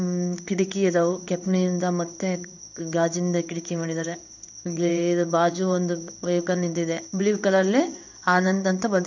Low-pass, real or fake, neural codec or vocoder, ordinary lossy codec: 7.2 kHz; fake; codec, 16 kHz in and 24 kHz out, 1 kbps, XY-Tokenizer; none